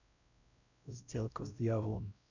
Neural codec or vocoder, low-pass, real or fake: codec, 16 kHz, 0.5 kbps, X-Codec, WavLM features, trained on Multilingual LibriSpeech; 7.2 kHz; fake